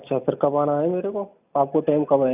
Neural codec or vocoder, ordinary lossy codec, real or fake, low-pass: none; none; real; 3.6 kHz